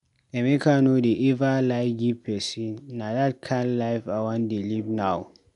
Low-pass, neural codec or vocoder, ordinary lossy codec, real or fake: 10.8 kHz; none; none; real